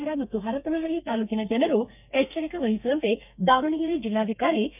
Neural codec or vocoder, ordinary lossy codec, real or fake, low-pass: codec, 32 kHz, 1.9 kbps, SNAC; none; fake; 3.6 kHz